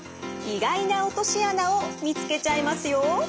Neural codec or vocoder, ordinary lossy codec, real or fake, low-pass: none; none; real; none